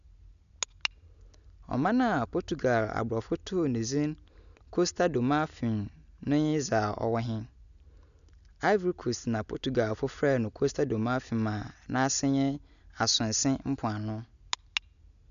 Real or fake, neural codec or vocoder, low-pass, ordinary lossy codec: real; none; 7.2 kHz; none